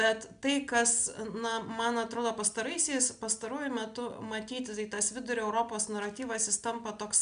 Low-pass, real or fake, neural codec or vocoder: 9.9 kHz; real; none